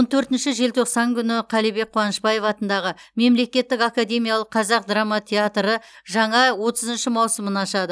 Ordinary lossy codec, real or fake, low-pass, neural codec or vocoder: none; real; none; none